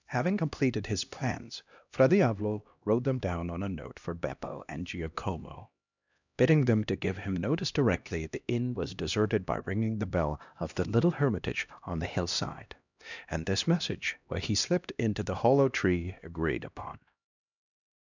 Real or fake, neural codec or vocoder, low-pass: fake; codec, 16 kHz, 1 kbps, X-Codec, HuBERT features, trained on LibriSpeech; 7.2 kHz